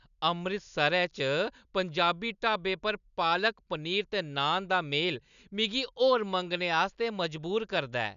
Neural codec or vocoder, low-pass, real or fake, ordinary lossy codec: none; 7.2 kHz; real; none